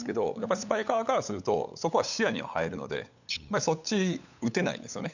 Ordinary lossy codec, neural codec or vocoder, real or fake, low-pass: none; codec, 16 kHz, 8 kbps, FunCodec, trained on LibriTTS, 25 frames a second; fake; 7.2 kHz